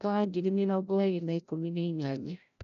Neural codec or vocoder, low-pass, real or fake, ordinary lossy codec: codec, 16 kHz, 0.5 kbps, FreqCodec, larger model; 7.2 kHz; fake; none